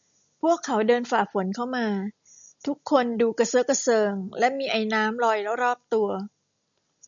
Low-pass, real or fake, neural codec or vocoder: 7.2 kHz; real; none